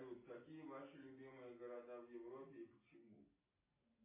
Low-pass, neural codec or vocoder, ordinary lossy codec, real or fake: 3.6 kHz; none; AAC, 16 kbps; real